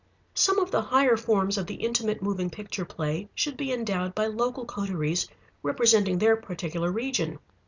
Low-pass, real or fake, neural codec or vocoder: 7.2 kHz; real; none